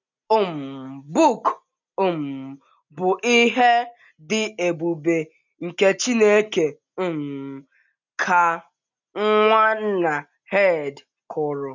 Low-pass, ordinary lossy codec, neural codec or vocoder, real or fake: 7.2 kHz; none; none; real